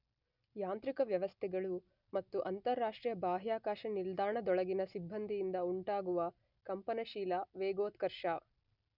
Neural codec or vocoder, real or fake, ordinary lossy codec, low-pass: none; real; none; 5.4 kHz